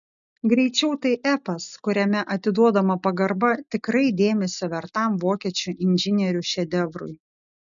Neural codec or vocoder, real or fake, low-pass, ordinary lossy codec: none; real; 7.2 kHz; MP3, 96 kbps